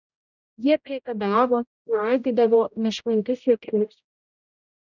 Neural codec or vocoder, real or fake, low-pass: codec, 16 kHz, 0.5 kbps, X-Codec, HuBERT features, trained on general audio; fake; 7.2 kHz